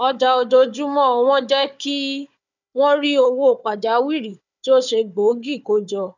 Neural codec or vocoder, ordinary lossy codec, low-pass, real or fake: codec, 16 kHz, 4 kbps, FunCodec, trained on Chinese and English, 50 frames a second; none; 7.2 kHz; fake